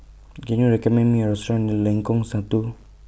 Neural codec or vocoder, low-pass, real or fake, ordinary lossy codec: none; none; real; none